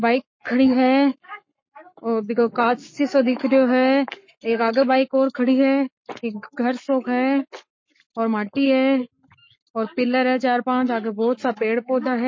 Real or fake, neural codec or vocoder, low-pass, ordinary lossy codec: fake; codec, 44.1 kHz, 7.8 kbps, Pupu-Codec; 7.2 kHz; MP3, 32 kbps